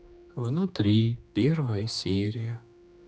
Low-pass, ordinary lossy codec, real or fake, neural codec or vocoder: none; none; fake; codec, 16 kHz, 2 kbps, X-Codec, HuBERT features, trained on general audio